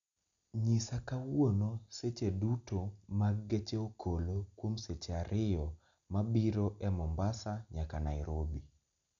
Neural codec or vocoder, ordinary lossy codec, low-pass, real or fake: none; none; 7.2 kHz; real